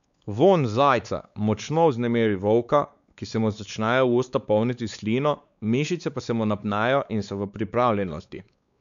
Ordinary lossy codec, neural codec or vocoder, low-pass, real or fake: none; codec, 16 kHz, 4 kbps, X-Codec, WavLM features, trained on Multilingual LibriSpeech; 7.2 kHz; fake